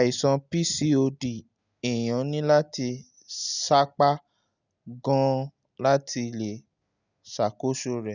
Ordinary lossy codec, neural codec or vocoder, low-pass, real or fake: none; none; 7.2 kHz; real